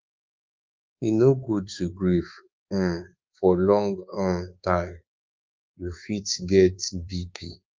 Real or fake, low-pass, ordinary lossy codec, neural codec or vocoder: fake; 7.2 kHz; Opus, 24 kbps; codec, 24 kHz, 1.2 kbps, DualCodec